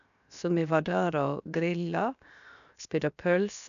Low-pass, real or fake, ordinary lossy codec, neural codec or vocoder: 7.2 kHz; fake; MP3, 96 kbps; codec, 16 kHz, 0.7 kbps, FocalCodec